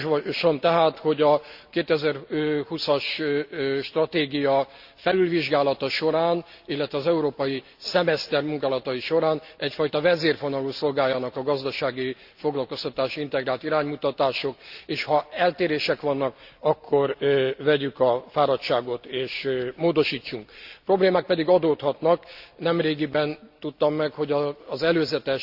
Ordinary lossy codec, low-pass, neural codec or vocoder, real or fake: Opus, 64 kbps; 5.4 kHz; none; real